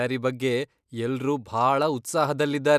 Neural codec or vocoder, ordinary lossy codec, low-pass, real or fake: none; none; 14.4 kHz; real